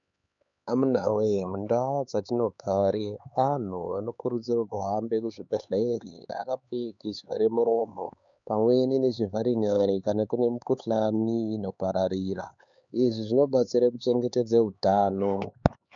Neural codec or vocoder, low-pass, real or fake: codec, 16 kHz, 4 kbps, X-Codec, HuBERT features, trained on LibriSpeech; 7.2 kHz; fake